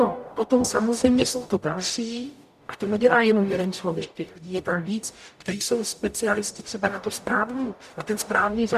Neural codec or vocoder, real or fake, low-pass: codec, 44.1 kHz, 0.9 kbps, DAC; fake; 14.4 kHz